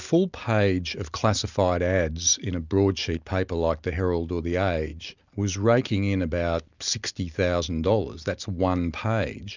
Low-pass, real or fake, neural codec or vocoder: 7.2 kHz; real; none